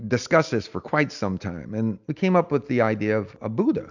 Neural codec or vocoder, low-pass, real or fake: none; 7.2 kHz; real